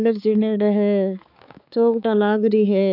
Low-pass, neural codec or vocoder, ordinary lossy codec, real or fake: 5.4 kHz; codec, 16 kHz, 4 kbps, X-Codec, HuBERT features, trained on balanced general audio; none; fake